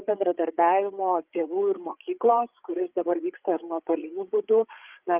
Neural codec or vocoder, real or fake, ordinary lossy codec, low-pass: codec, 16 kHz, 8 kbps, FreqCodec, larger model; fake; Opus, 24 kbps; 3.6 kHz